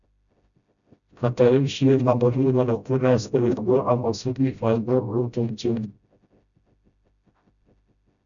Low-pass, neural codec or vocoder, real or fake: 7.2 kHz; codec, 16 kHz, 0.5 kbps, FreqCodec, smaller model; fake